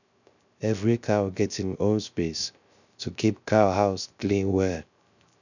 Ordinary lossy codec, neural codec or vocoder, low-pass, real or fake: none; codec, 16 kHz, 0.3 kbps, FocalCodec; 7.2 kHz; fake